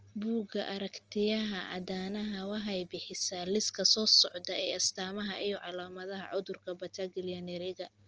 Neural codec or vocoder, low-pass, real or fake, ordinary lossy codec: none; 7.2 kHz; real; Opus, 24 kbps